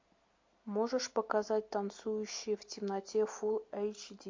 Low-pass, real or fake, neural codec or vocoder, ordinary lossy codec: 7.2 kHz; real; none; MP3, 48 kbps